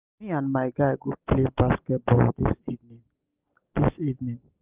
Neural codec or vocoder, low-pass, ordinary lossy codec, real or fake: none; 3.6 kHz; Opus, 32 kbps; real